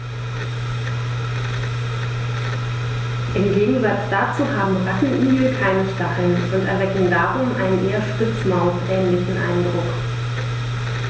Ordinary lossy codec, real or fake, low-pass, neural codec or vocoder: none; real; none; none